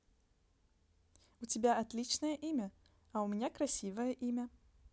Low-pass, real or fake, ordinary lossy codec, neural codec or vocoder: none; real; none; none